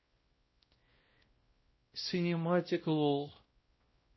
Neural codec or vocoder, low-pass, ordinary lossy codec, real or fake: codec, 16 kHz, 0.5 kbps, X-Codec, WavLM features, trained on Multilingual LibriSpeech; 7.2 kHz; MP3, 24 kbps; fake